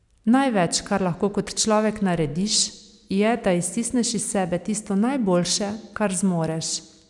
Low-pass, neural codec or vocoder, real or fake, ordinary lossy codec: 10.8 kHz; none; real; none